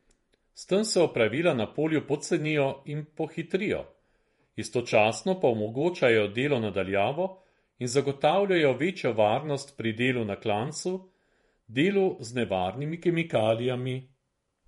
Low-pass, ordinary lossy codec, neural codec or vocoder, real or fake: 19.8 kHz; MP3, 48 kbps; vocoder, 48 kHz, 128 mel bands, Vocos; fake